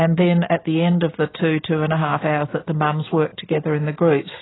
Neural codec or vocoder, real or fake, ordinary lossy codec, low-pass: none; real; AAC, 16 kbps; 7.2 kHz